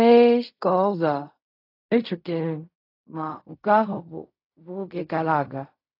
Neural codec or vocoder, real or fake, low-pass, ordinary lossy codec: codec, 16 kHz in and 24 kHz out, 0.4 kbps, LongCat-Audio-Codec, fine tuned four codebook decoder; fake; 5.4 kHz; AAC, 32 kbps